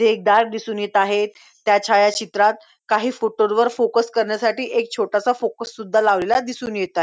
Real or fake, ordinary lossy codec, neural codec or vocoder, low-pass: real; none; none; none